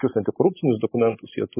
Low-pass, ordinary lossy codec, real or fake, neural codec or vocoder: 3.6 kHz; MP3, 16 kbps; real; none